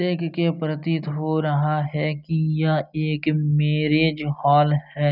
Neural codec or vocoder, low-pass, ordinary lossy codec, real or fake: none; 5.4 kHz; none; real